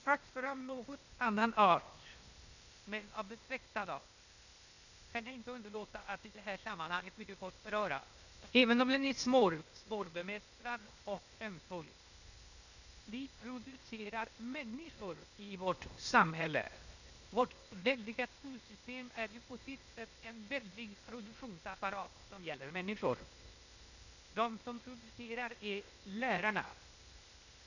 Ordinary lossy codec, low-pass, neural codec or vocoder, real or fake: none; 7.2 kHz; codec, 16 kHz, 0.8 kbps, ZipCodec; fake